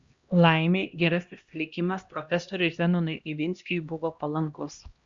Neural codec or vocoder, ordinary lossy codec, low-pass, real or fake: codec, 16 kHz, 1 kbps, X-Codec, HuBERT features, trained on LibriSpeech; Opus, 64 kbps; 7.2 kHz; fake